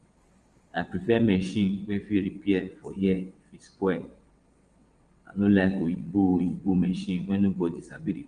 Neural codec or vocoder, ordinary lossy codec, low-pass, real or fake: vocoder, 22.05 kHz, 80 mel bands, WaveNeXt; Opus, 32 kbps; 9.9 kHz; fake